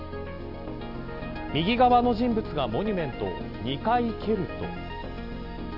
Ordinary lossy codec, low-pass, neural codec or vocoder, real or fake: none; 5.4 kHz; none; real